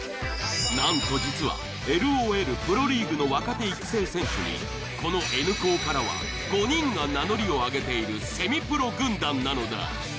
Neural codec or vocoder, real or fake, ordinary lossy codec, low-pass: none; real; none; none